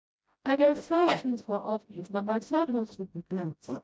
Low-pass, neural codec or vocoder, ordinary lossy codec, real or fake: none; codec, 16 kHz, 0.5 kbps, FreqCodec, smaller model; none; fake